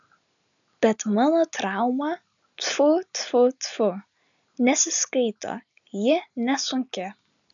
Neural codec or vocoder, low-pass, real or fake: none; 7.2 kHz; real